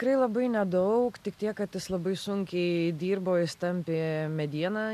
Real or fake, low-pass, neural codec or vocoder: real; 14.4 kHz; none